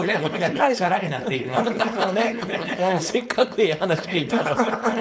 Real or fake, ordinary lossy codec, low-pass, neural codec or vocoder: fake; none; none; codec, 16 kHz, 4.8 kbps, FACodec